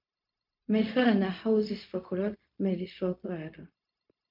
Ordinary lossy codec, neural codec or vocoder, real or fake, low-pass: MP3, 48 kbps; codec, 16 kHz, 0.4 kbps, LongCat-Audio-Codec; fake; 5.4 kHz